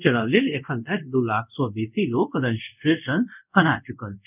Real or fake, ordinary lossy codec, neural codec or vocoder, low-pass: fake; none; codec, 24 kHz, 0.5 kbps, DualCodec; 3.6 kHz